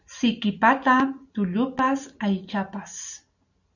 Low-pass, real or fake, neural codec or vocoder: 7.2 kHz; real; none